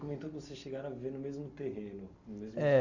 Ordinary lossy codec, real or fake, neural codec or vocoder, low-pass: Opus, 64 kbps; real; none; 7.2 kHz